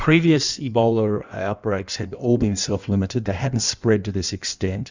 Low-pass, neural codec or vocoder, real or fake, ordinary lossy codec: 7.2 kHz; codec, 16 kHz in and 24 kHz out, 1.1 kbps, FireRedTTS-2 codec; fake; Opus, 64 kbps